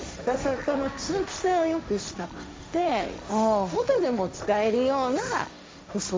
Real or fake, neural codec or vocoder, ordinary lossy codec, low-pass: fake; codec, 16 kHz, 1.1 kbps, Voila-Tokenizer; none; none